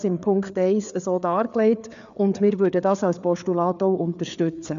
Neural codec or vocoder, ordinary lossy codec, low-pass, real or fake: codec, 16 kHz, 4 kbps, FreqCodec, larger model; none; 7.2 kHz; fake